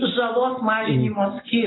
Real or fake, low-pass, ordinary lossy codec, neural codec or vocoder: real; 7.2 kHz; AAC, 16 kbps; none